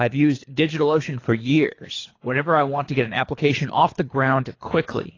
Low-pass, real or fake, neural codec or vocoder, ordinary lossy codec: 7.2 kHz; fake; codec, 24 kHz, 3 kbps, HILCodec; AAC, 32 kbps